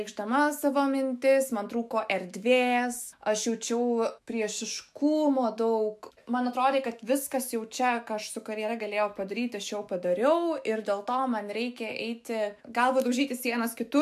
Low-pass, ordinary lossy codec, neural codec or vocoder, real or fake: 14.4 kHz; MP3, 96 kbps; autoencoder, 48 kHz, 128 numbers a frame, DAC-VAE, trained on Japanese speech; fake